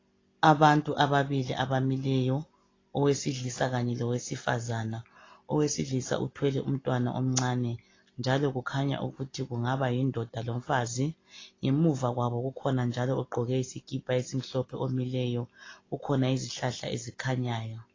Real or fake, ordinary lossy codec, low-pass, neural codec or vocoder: real; AAC, 32 kbps; 7.2 kHz; none